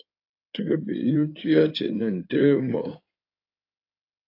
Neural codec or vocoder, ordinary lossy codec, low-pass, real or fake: codec, 16 kHz in and 24 kHz out, 2.2 kbps, FireRedTTS-2 codec; AAC, 32 kbps; 5.4 kHz; fake